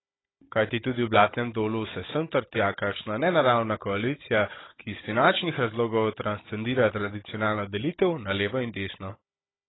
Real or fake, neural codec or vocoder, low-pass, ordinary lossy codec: fake; codec, 16 kHz, 16 kbps, FunCodec, trained on Chinese and English, 50 frames a second; 7.2 kHz; AAC, 16 kbps